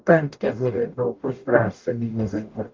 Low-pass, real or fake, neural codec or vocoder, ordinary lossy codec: 7.2 kHz; fake; codec, 44.1 kHz, 0.9 kbps, DAC; Opus, 32 kbps